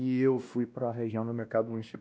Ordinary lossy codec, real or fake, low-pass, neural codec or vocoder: none; fake; none; codec, 16 kHz, 1 kbps, X-Codec, WavLM features, trained on Multilingual LibriSpeech